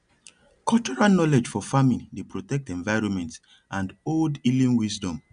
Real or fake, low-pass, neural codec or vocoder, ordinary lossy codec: real; 9.9 kHz; none; none